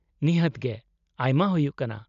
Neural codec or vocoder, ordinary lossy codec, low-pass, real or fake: none; none; 7.2 kHz; real